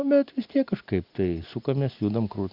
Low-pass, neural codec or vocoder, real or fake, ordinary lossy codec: 5.4 kHz; none; real; Opus, 64 kbps